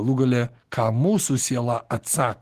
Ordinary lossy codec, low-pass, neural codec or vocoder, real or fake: Opus, 16 kbps; 14.4 kHz; autoencoder, 48 kHz, 128 numbers a frame, DAC-VAE, trained on Japanese speech; fake